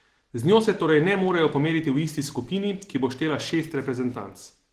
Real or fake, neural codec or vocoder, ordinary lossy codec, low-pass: real; none; Opus, 16 kbps; 14.4 kHz